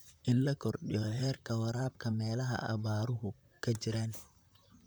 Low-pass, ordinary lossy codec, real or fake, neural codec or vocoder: none; none; fake; vocoder, 44.1 kHz, 128 mel bands, Pupu-Vocoder